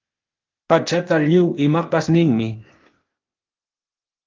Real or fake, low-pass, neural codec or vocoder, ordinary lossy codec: fake; 7.2 kHz; codec, 16 kHz, 0.8 kbps, ZipCodec; Opus, 32 kbps